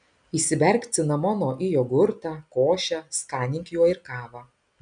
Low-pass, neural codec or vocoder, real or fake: 9.9 kHz; none; real